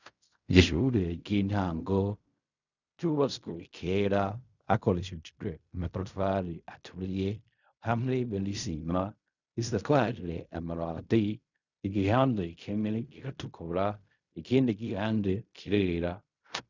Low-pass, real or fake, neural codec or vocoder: 7.2 kHz; fake; codec, 16 kHz in and 24 kHz out, 0.4 kbps, LongCat-Audio-Codec, fine tuned four codebook decoder